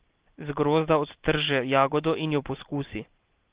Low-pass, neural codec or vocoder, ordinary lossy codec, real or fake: 3.6 kHz; none; Opus, 16 kbps; real